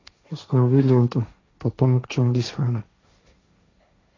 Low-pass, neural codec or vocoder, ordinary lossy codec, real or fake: 7.2 kHz; codec, 16 kHz, 1.1 kbps, Voila-Tokenizer; AAC, 32 kbps; fake